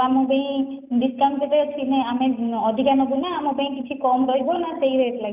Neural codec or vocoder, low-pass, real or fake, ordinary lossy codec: none; 3.6 kHz; real; none